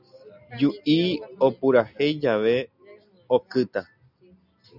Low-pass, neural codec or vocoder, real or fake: 5.4 kHz; none; real